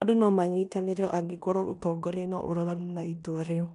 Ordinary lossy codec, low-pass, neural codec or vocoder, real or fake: none; 10.8 kHz; codec, 16 kHz in and 24 kHz out, 0.9 kbps, LongCat-Audio-Codec, four codebook decoder; fake